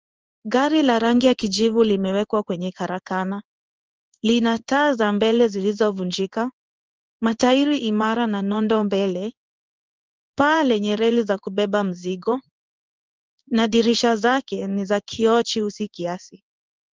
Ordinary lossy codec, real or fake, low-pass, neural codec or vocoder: Opus, 24 kbps; fake; 7.2 kHz; codec, 16 kHz in and 24 kHz out, 1 kbps, XY-Tokenizer